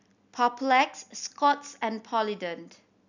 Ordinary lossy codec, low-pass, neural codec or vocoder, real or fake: none; 7.2 kHz; none; real